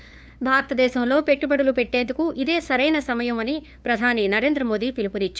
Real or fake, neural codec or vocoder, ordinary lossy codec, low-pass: fake; codec, 16 kHz, 2 kbps, FunCodec, trained on LibriTTS, 25 frames a second; none; none